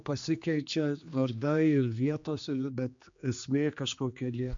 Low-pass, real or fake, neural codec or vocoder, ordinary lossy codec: 7.2 kHz; fake; codec, 16 kHz, 2 kbps, X-Codec, HuBERT features, trained on general audio; MP3, 64 kbps